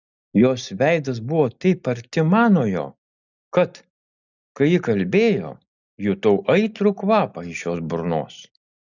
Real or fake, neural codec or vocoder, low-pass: real; none; 7.2 kHz